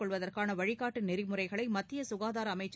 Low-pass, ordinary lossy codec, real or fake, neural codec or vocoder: none; none; real; none